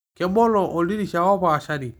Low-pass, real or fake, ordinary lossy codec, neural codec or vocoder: none; real; none; none